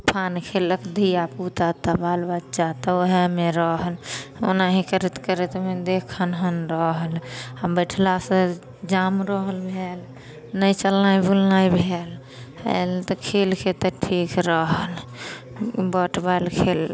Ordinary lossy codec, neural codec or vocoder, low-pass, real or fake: none; none; none; real